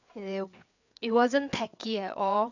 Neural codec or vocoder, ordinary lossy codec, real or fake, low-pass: codec, 16 kHz, 4 kbps, FreqCodec, larger model; none; fake; 7.2 kHz